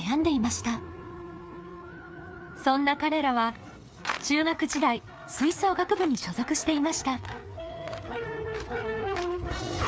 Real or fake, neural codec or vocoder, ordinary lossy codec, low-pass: fake; codec, 16 kHz, 4 kbps, FreqCodec, larger model; none; none